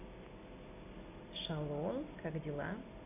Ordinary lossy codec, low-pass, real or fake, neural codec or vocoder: none; 3.6 kHz; real; none